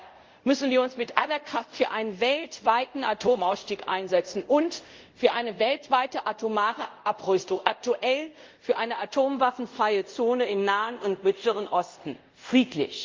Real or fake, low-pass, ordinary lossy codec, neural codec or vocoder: fake; 7.2 kHz; Opus, 32 kbps; codec, 24 kHz, 0.5 kbps, DualCodec